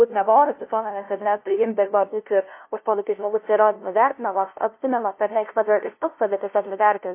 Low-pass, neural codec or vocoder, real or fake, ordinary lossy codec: 3.6 kHz; codec, 16 kHz, 0.5 kbps, FunCodec, trained on LibriTTS, 25 frames a second; fake; MP3, 24 kbps